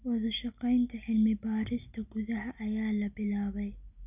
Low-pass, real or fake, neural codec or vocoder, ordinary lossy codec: 3.6 kHz; real; none; none